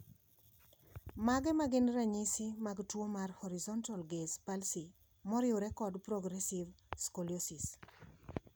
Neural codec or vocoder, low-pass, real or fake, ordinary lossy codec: none; none; real; none